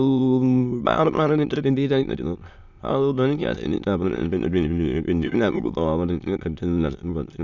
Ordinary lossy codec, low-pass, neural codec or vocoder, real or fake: none; 7.2 kHz; autoencoder, 22.05 kHz, a latent of 192 numbers a frame, VITS, trained on many speakers; fake